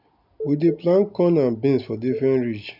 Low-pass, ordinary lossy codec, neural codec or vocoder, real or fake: 5.4 kHz; none; none; real